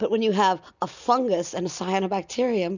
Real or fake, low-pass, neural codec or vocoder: real; 7.2 kHz; none